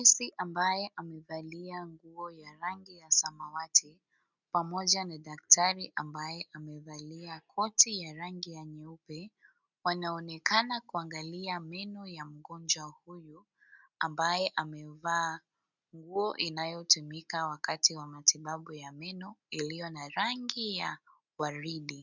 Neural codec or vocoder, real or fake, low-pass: none; real; 7.2 kHz